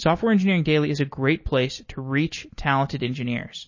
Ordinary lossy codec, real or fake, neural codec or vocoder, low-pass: MP3, 32 kbps; real; none; 7.2 kHz